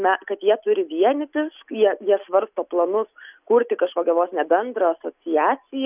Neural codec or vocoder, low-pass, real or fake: none; 3.6 kHz; real